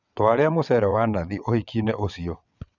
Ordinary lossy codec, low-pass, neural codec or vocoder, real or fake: none; 7.2 kHz; vocoder, 22.05 kHz, 80 mel bands, WaveNeXt; fake